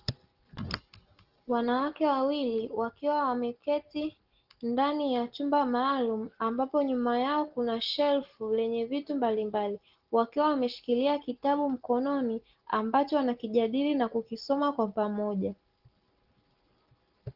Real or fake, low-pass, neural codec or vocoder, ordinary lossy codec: real; 5.4 kHz; none; Opus, 16 kbps